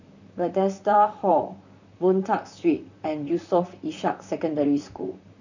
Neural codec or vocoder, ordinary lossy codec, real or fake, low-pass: vocoder, 44.1 kHz, 128 mel bands, Pupu-Vocoder; none; fake; 7.2 kHz